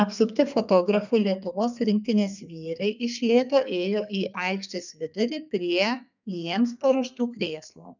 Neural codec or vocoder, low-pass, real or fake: codec, 32 kHz, 1.9 kbps, SNAC; 7.2 kHz; fake